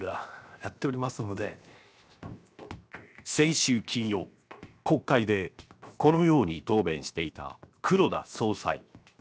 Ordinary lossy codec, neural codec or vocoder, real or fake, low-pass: none; codec, 16 kHz, 0.7 kbps, FocalCodec; fake; none